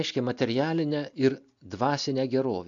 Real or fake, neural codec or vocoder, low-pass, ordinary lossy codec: real; none; 7.2 kHz; AAC, 64 kbps